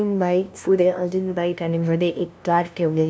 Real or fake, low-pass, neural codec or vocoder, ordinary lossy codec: fake; none; codec, 16 kHz, 0.5 kbps, FunCodec, trained on LibriTTS, 25 frames a second; none